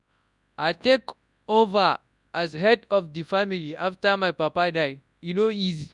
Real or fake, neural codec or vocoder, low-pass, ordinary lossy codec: fake; codec, 24 kHz, 0.9 kbps, WavTokenizer, large speech release; 10.8 kHz; none